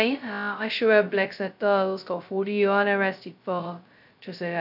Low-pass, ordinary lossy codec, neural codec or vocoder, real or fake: 5.4 kHz; none; codec, 16 kHz, 0.2 kbps, FocalCodec; fake